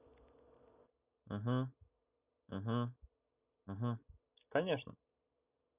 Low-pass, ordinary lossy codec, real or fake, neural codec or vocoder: 3.6 kHz; none; real; none